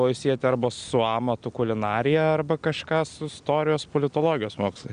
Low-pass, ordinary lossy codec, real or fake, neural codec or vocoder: 9.9 kHz; Opus, 64 kbps; real; none